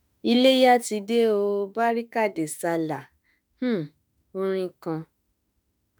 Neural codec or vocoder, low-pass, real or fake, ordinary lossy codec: autoencoder, 48 kHz, 32 numbers a frame, DAC-VAE, trained on Japanese speech; none; fake; none